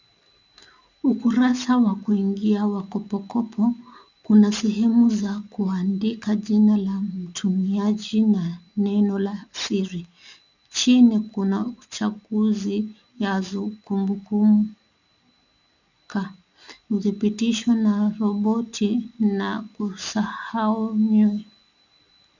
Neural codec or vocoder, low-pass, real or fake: none; 7.2 kHz; real